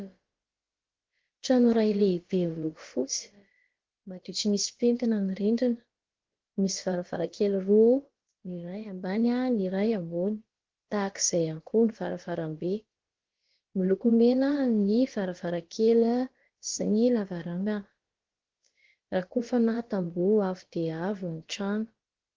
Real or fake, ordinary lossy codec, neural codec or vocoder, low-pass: fake; Opus, 16 kbps; codec, 16 kHz, about 1 kbps, DyCAST, with the encoder's durations; 7.2 kHz